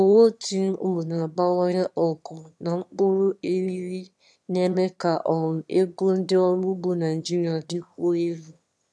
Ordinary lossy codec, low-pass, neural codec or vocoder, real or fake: none; none; autoencoder, 22.05 kHz, a latent of 192 numbers a frame, VITS, trained on one speaker; fake